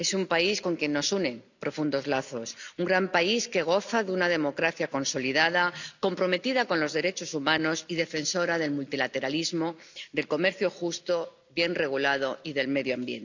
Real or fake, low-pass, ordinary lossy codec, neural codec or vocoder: real; 7.2 kHz; none; none